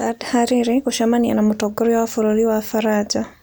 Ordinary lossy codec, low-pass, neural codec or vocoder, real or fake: none; none; none; real